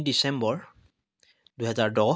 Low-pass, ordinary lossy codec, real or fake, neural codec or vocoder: none; none; real; none